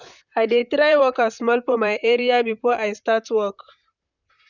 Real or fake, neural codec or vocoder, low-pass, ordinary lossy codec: fake; vocoder, 44.1 kHz, 128 mel bands, Pupu-Vocoder; 7.2 kHz; none